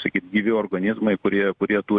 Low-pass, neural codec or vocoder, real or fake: 9.9 kHz; none; real